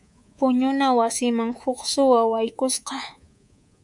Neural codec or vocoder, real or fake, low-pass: codec, 24 kHz, 3.1 kbps, DualCodec; fake; 10.8 kHz